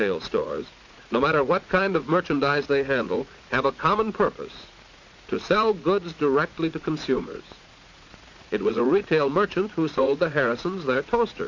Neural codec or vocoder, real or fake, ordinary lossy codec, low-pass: vocoder, 44.1 kHz, 80 mel bands, Vocos; fake; MP3, 48 kbps; 7.2 kHz